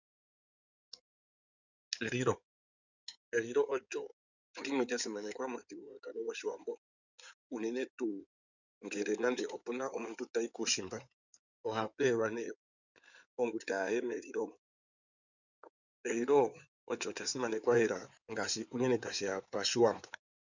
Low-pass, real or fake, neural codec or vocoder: 7.2 kHz; fake; codec, 16 kHz in and 24 kHz out, 2.2 kbps, FireRedTTS-2 codec